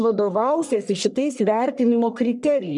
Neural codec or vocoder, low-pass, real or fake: codec, 44.1 kHz, 1.7 kbps, Pupu-Codec; 10.8 kHz; fake